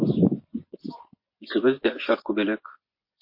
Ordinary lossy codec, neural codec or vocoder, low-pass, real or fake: MP3, 32 kbps; vocoder, 24 kHz, 100 mel bands, Vocos; 5.4 kHz; fake